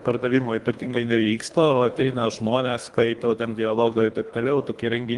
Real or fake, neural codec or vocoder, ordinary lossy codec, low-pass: fake; codec, 24 kHz, 1.5 kbps, HILCodec; Opus, 24 kbps; 10.8 kHz